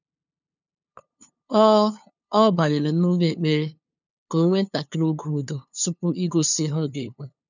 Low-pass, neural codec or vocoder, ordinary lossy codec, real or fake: 7.2 kHz; codec, 16 kHz, 2 kbps, FunCodec, trained on LibriTTS, 25 frames a second; none; fake